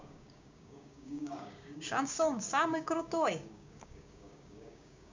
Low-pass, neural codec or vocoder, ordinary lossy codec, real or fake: 7.2 kHz; codec, 16 kHz, 6 kbps, DAC; MP3, 64 kbps; fake